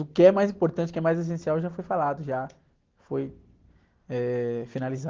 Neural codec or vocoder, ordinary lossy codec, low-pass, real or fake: none; Opus, 16 kbps; 7.2 kHz; real